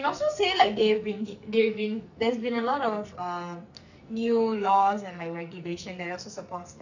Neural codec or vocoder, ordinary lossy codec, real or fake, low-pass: codec, 44.1 kHz, 2.6 kbps, SNAC; none; fake; 7.2 kHz